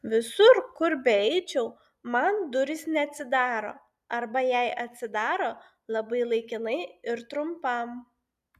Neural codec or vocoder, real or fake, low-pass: none; real; 14.4 kHz